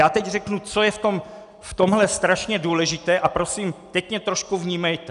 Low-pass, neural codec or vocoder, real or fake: 10.8 kHz; none; real